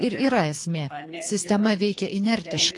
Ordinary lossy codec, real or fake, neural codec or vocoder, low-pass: AAC, 48 kbps; fake; codec, 24 kHz, 3 kbps, HILCodec; 10.8 kHz